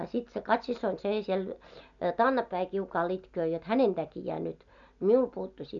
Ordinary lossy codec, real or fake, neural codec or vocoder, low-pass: none; real; none; 7.2 kHz